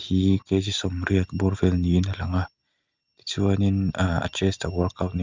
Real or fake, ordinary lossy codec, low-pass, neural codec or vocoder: real; Opus, 24 kbps; 7.2 kHz; none